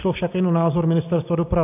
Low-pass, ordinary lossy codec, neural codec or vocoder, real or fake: 3.6 kHz; AAC, 32 kbps; none; real